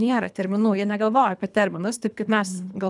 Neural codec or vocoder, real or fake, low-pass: codec, 24 kHz, 3 kbps, HILCodec; fake; 10.8 kHz